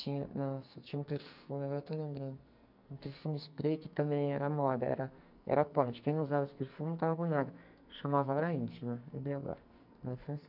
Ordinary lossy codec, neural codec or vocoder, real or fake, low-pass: none; codec, 44.1 kHz, 2.6 kbps, SNAC; fake; 5.4 kHz